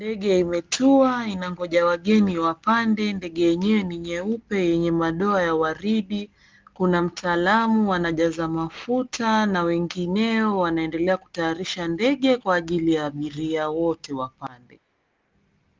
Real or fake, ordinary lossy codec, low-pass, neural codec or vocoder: real; Opus, 32 kbps; 7.2 kHz; none